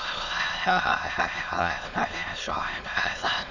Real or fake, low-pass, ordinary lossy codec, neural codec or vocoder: fake; 7.2 kHz; none; autoencoder, 22.05 kHz, a latent of 192 numbers a frame, VITS, trained on many speakers